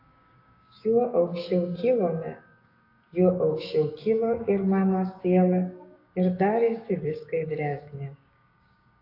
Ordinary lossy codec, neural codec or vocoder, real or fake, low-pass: AAC, 32 kbps; codec, 16 kHz, 6 kbps, DAC; fake; 5.4 kHz